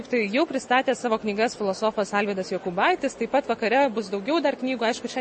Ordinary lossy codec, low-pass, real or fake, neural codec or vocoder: MP3, 32 kbps; 10.8 kHz; real; none